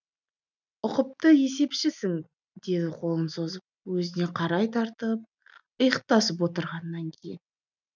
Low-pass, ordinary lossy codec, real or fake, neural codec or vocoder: 7.2 kHz; none; real; none